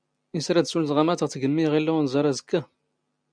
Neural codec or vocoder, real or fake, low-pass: none; real; 9.9 kHz